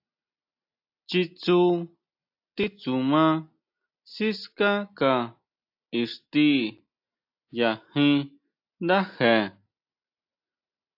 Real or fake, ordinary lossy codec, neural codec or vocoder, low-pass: real; AAC, 48 kbps; none; 5.4 kHz